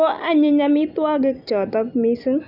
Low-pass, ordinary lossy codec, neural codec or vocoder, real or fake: 5.4 kHz; none; none; real